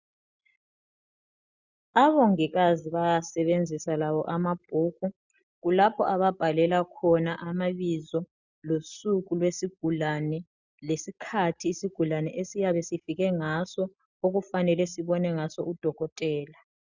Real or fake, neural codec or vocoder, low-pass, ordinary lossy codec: real; none; 7.2 kHz; Opus, 64 kbps